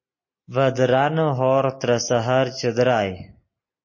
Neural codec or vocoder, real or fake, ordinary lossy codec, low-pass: none; real; MP3, 32 kbps; 7.2 kHz